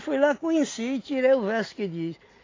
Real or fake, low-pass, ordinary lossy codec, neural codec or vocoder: real; 7.2 kHz; AAC, 32 kbps; none